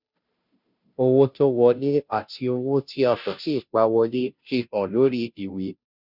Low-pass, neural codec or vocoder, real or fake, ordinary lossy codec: 5.4 kHz; codec, 16 kHz, 0.5 kbps, FunCodec, trained on Chinese and English, 25 frames a second; fake; none